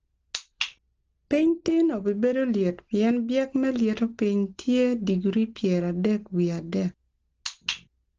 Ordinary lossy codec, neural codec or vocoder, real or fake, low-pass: Opus, 16 kbps; none; real; 7.2 kHz